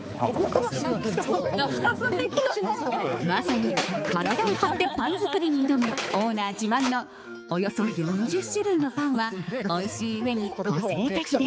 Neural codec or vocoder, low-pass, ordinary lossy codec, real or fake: codec, 16 kHz, 4 kbps, X-Codec, HuBERT features, trained on balanced general audio; none; none; fake